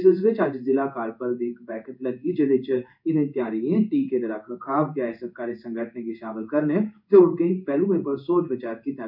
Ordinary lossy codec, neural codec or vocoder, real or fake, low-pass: none; codec, 16 kHz in and 24 kHz out, 1 kbps, XY-Tokenizer; fake; 5.4 kHz